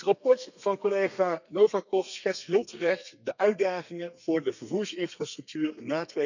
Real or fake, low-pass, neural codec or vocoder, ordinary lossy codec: fake; 7.2 kHz; codec, 32 kHz, 1.9 kbps, SNAC; none